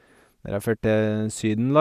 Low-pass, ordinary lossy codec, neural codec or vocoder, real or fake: 14.4 kHz; none; none; real